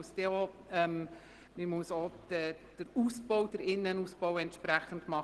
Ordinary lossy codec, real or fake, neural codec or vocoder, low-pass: Opus, 16 kbps; real; none; 10.8 kHz